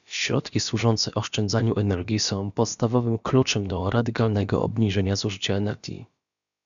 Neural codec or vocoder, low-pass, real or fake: codec, 16 kHz, about 1 kbps, DyCAST, with the encoder's durations; 7.2 kHz; fake